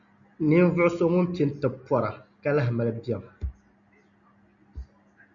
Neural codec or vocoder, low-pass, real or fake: none; 7.2 kHz; real